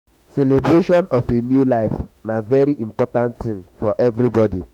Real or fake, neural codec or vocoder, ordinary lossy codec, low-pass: fake; autoencoder, 48 kHz, 32 numbers a frame, DAC-VAE, trained on Japanese speech; none; 19.8 kHz